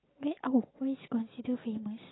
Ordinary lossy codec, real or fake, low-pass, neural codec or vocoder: AAC, 16 kbps; real; 7.2 kHz; none